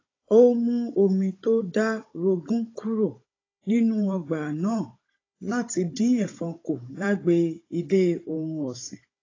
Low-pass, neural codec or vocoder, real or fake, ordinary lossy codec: 7.2 kHz; codec, 16 kHz, 16 kbps, FunCodec, trained on Chinese and English, 50 frames a second; fake; AAC, 32 kbps